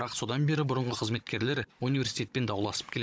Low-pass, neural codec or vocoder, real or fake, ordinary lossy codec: none; codec, 16 kHz, 16 kbps, FunCodec, trained on Chinese and English, 50 frames a second; fake; none